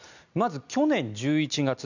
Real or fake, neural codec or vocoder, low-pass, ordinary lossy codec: real; none; 7.2 kHz; none